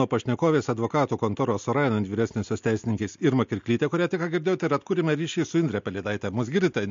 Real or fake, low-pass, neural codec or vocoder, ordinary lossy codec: real; 7.2 kHz; none; MP3, 48 kbps